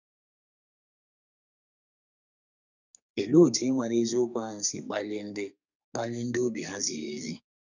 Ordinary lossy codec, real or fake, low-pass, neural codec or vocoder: none; fake; 7.2 kHz; codec, 32 kHz, 1.9 kbps, SNAC